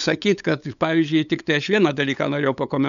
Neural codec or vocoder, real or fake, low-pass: codec, 16 kHz, 8 kbps, FunCodec, trained on LibriTTS, 25 frames a second; fake; 7.2 kHz